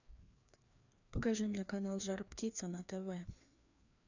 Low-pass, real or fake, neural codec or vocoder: 7.2 kHz; fake; codec, 16 kHz, 2 kbps, FreqCodec, larger model